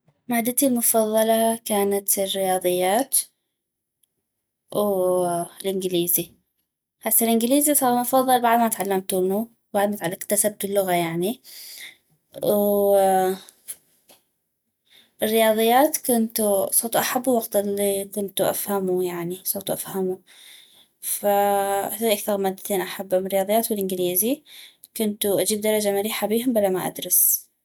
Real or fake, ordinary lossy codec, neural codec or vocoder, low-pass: real; none; none; none